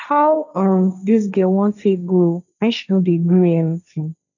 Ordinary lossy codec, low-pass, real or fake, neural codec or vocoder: none; 7.2 kHz; fake; codec, 16 kHz, 1.1 kbps, Voila-Tokenizer